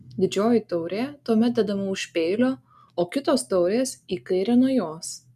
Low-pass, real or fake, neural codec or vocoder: 14.4 kHz; real; none